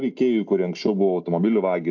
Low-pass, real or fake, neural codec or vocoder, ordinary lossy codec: 7.2 kHz; real; none; AAC, 48 kbps